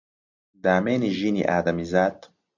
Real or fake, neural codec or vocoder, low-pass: real; none; 7.2 kHz